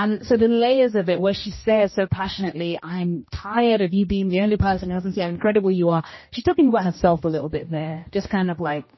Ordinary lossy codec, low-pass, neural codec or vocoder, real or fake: MP3, 24 kbps; 7.2 kHz; codec, 16 kHz, 1 kbps, X-Codec, HuBERT features, trained on general audio; fake